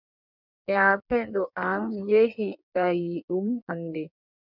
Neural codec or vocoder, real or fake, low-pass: codec, 16 kHz in and 24 kHz out, 1.1 kbps, FireRedTTS-2 codec; fake; 5.4 kHz